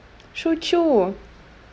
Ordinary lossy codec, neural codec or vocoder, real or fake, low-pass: none; none; real; none